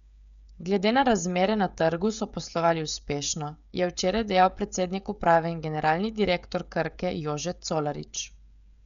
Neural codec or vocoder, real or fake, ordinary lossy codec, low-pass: codec, 16 kHz, 16 kbps, FreqCodec, smaller model; fake; MP3, 96 kbps; 7.2 kHz